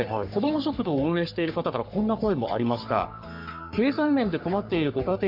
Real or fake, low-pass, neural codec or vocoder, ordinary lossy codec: fake; 5.4 kHz; codec, 44.1 kHz, 3.4 kbps, Pupu-Codec; AAC, 48 kbps